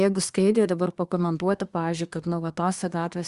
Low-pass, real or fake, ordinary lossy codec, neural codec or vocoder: 10.8 kHz; fake; Opus, 64 kbps; codec, 24 kHz, 1 kbps, SNAC